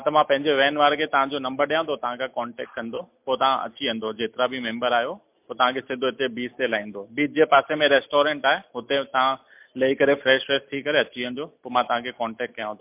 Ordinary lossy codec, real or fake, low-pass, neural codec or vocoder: MP3, 32 kbps; real; 3.6 kHz; none